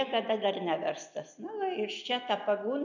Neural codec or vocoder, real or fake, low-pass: none; real; 7.2 kHz